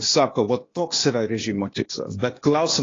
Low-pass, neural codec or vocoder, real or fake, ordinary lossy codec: 7.2 kHz; codec, 16 kHz, 0.8 kbps, ZipCodec; fake; AAC, 32 kbps